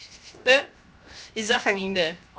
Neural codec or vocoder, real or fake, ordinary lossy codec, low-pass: codec, 16 kHz, 0.7 kbps, FocalCodec; fake; none; none